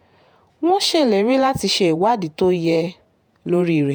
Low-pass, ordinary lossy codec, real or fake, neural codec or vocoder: none; none; fake; vocoder, 48 kHz, 128 mel bands, Vocos